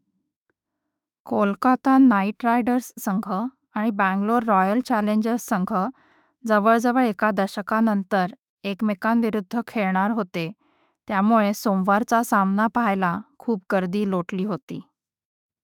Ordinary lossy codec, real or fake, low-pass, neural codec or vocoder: none; fake; 19.8 kHz; autoencoder, 48 kHz, 32 numbers a frame, DAC-VAE, trained on Japanese speech